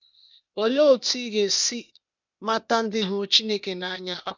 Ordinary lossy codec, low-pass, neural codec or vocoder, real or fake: none; 7.2 kHz; codec, 16 kHz, 0.8 kbps, ZipCodec; fake